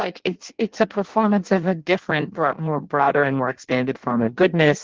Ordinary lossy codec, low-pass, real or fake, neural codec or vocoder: Opus, 16 kbps; 7.2 kHz; fake; codec, 16 kHz in and 24 kHz out, 0.6 kbps, FireRedTTS-2 codec